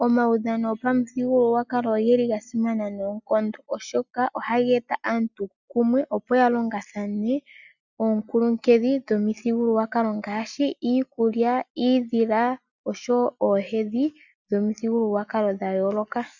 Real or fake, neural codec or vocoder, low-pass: real; none; 7.2 kHz